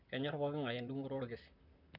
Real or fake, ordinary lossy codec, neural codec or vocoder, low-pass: fake; none; vocoder, 44.1 kHz, 128 mel bands, Pupu-Vocoder; 5.4 kHz